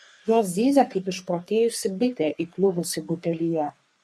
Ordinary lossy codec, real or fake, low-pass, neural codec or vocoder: MP3, 64 kbps; fake; 14.4 kHz; codec, 44.1 kHz, 3.4 kbps, Pupu-Codec